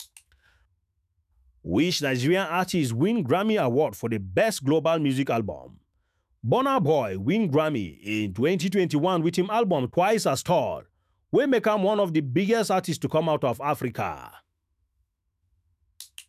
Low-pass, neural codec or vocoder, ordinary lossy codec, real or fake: 14.4 kHz; autoencoder, 48 kHz, 128 numbers a frame, DAC-VAE, trained on Japanese speech; none; fake